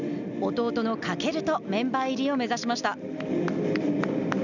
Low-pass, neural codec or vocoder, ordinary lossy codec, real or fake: 7.2 kHz; none; none; real